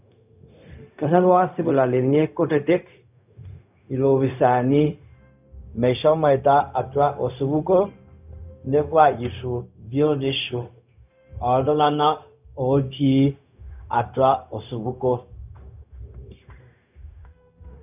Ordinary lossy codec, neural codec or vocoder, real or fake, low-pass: none; codec, 16 kHz, 0.4 kbps, LongCat-Audio-Codec; fake; 3.6 kHz